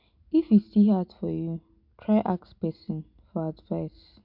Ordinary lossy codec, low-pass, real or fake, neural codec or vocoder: MP3, 48 kbps; 5.4 kHz; real; none